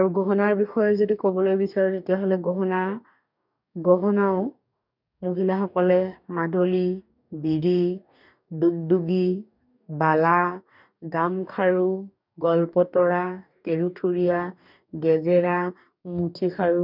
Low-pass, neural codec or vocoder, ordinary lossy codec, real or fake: 5.4 kHz; codec, 44.1 kHz, 2.6 kbps, DAC; none; fake